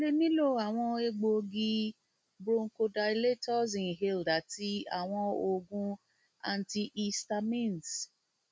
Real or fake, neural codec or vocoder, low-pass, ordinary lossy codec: real; none; none; none